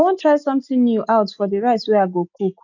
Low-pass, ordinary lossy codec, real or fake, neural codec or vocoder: 7.2 kHz; none; real; none